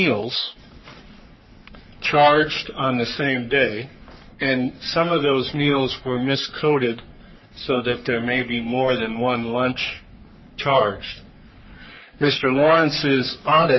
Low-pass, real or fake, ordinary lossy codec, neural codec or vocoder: 7.2 kHz; fake; MP3, 24 kbps; codec, 44.1 kHz, 3.4 kbps, Pupu-Codec